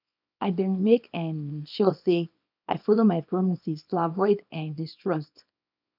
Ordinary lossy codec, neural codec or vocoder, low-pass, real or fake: none; codec, 24 kHz, 0.9 kbps, WavTokenizer, small release; 5.4 kHz; fake